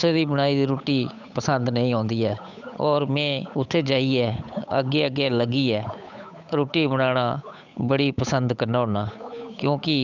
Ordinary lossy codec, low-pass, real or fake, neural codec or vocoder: none; 7.2 kHz; fake; codec, 16 kHz, 8 kbps, FunCodec, trained on Chinese and English, 25 frames a second